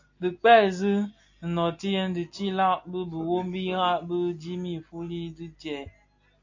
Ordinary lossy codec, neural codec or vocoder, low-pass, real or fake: AAC, 48 kbps; none; 7.2 kHz; real